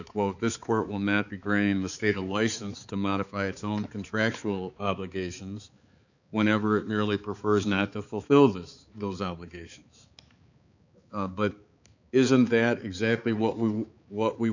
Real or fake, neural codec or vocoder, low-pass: fake; codec, 16 kHz, 4 kbps, X-Codec, HuBERT features, trained on balanced general audio; 7.2 kHz